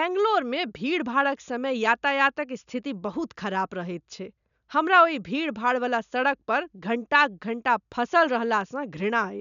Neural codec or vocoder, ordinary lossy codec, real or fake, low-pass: none; none; real; 7.2 kHz